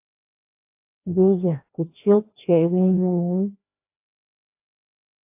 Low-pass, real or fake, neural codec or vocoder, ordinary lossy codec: 3.6 kHz; fake; codec, 16 kHz, 1 kbps, FreqCodec, larger model; AAC, 32 kbps